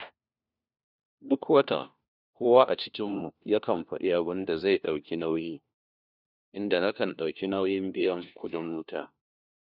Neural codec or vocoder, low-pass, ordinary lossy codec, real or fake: codec, 16 kHz, 1 kbps, FunCodec, trained on LibriTTS, 50 frames a second; 5.4 kHz; none; fake